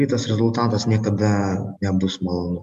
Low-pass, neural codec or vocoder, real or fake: 14.4 kHz; none; real